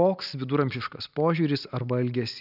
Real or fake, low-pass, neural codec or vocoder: real; 5.4 kHz; none